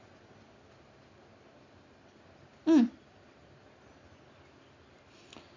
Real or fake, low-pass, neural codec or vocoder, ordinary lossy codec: real; 7.2 kHz; none; MP3, 48 kbps